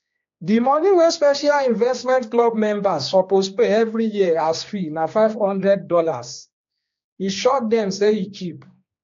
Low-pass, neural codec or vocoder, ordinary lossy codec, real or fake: 7.2 kHz; codec, 16 kHz, 2 kbps, X-Codec, HuBERT features, trained on general audio; AAC, 48 kbps; fake